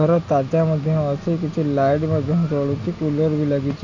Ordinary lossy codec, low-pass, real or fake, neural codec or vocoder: none; 7.2 kHz; real; none